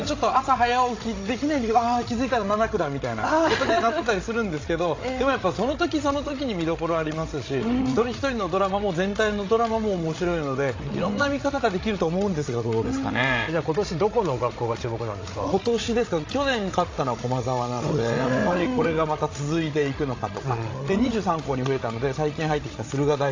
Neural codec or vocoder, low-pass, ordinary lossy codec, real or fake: codec, 16 kHz, 16 kbps, FreqCodec, larger model; 7.2 kHz; AAC, 32 kbps; fake